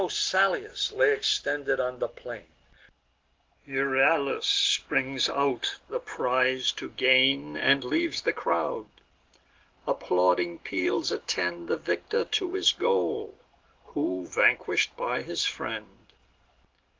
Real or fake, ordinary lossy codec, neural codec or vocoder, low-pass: real; Opus, 16 kbps; none; 7.2 kHz